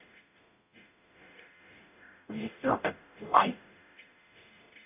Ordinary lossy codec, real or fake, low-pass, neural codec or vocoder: none; fake; 3.6 kHz; codec, 44.1 kHz, 0.9 kbps, DAC